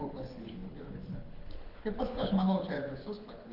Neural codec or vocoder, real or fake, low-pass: codec, 24 kHz, 6 kbps, HILCodec; fake; 5.4 kHz